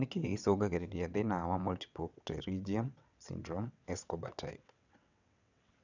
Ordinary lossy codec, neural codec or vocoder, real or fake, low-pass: none; vocoder, 22.05 kHz, 80 mel bands, WaveNeXt; fake; 7.2 kHz